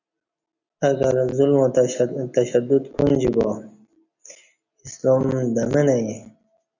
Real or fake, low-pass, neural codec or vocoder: real; 7.2 kHz; none